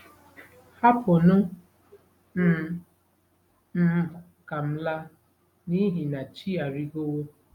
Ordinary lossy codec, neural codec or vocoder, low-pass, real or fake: none; none; 19.8 kHz; real